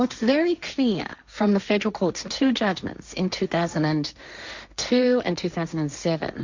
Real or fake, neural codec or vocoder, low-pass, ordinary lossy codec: fake; codec, 16 kHz, 1.1 kbps, Voila-Tokenizer; 7.2 kHz; Opus, 64 kbps